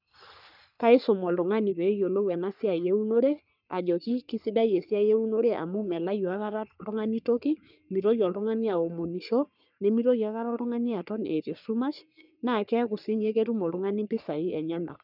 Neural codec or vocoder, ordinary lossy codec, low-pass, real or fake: codec, 44.1 kHz, 3.4 kbps, Pupu-Codec; none; 5.4 kHz; fake